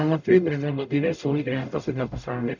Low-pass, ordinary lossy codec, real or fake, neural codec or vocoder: 7.2 kHz; none; fake; codec, 44.1 kHz, 0.9 kbps, DAC